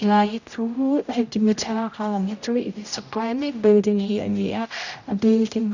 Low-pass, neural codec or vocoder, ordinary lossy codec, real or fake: 7.2 kHz; codec, 16 kHz, 0.5 kbps, X-Codec, HuBERT features, trained on general audio; none; fake